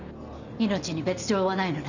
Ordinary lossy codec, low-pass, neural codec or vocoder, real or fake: none; 7.2 kHz; none; real